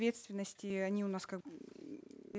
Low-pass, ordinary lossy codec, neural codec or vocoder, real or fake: none; none; none; real